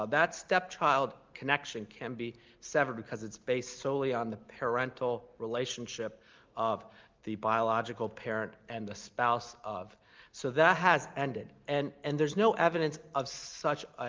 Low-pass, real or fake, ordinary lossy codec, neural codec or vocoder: 7.2 kHz; real; Opus, 32 kbps; none